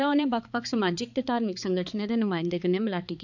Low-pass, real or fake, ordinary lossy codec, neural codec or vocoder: 7.2 kHz; fake; none; codec, 16 kHz, 4 kbps, X-Codec, HuBERT features, trained on balanced general audio